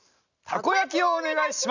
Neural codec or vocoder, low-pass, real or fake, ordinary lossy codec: none; 7.2 kHz; real; none